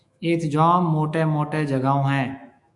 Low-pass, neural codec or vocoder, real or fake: 10.8 kHz; autoencoder, 48 kHz, 128 numbers a frame, DAC-VAE, trained on Japanese speech; fake